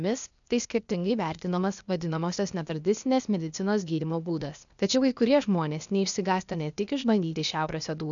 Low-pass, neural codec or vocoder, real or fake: 7.2 kHz; codec, 16 kHz, 0.8 kbps, ZipCodec; fake